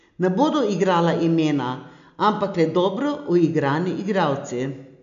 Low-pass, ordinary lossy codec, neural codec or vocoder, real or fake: 7.2 kHz; none; none; real